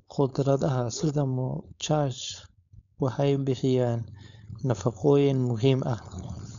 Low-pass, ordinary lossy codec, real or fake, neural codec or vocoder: 7.2 kHz; none; fake; codec, 16 kHz, 4.8 kbps, FACodec